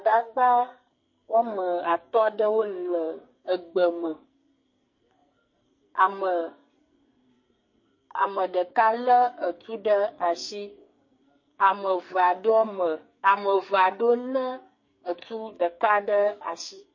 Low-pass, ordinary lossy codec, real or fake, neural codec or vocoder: 7.2 kHz; MP3, 32 kbps; fake; codec, 44.1 kHz, 2.6 kbps, SNAC